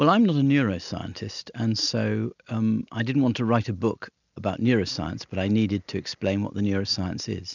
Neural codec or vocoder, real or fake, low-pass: none; real; 7.2 kHz